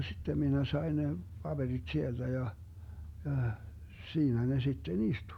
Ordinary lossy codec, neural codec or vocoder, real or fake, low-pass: none; none; real; 19.8 kHz